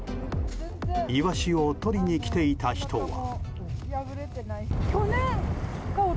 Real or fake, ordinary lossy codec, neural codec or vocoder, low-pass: real; none; none; none